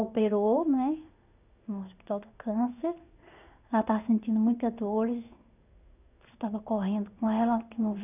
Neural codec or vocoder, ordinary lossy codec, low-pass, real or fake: codec, 16 kHz in and 24 kHz out, 1 kbps, XY-Tokenizer; none; 3.6 kHz; fake